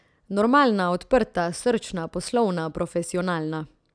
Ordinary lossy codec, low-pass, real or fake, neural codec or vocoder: none; 9.9 kHz; real; none